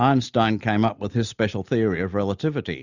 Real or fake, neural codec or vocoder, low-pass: real; none; 7.2 kHz